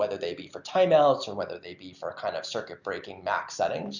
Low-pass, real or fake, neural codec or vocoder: 7.2 kHz; real; none